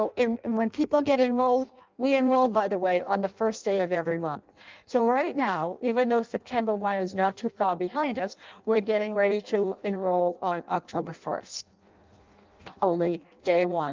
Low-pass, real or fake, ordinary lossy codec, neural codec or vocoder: 7.2 kHz; fake; Opus, 24 kbps; codec, 16 kHz in and 24 kHz out, 0.6 kbps, FireRedTTS-2 codec